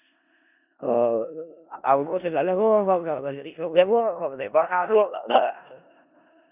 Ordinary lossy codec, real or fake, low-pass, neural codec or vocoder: none; fake; 3.6 kHz; codec, 16 kHz in and 24 kHz out, 0.4 kbps, LongCat-Audio-Codec, four codebook decoder